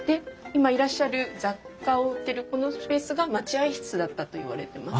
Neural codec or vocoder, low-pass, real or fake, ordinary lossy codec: none; none; real; none